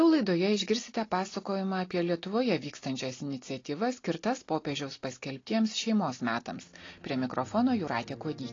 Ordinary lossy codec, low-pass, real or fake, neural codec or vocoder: AAC, 32 kbps; 7.2 kHz; real; none